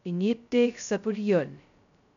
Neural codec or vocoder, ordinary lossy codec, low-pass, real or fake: codec, 16 kHz, 0.2 kbps, FocalCodec; none; 7.2 kHz; fake